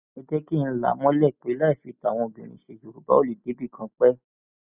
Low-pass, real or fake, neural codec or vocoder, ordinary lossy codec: 3.6 kHz; real; none; none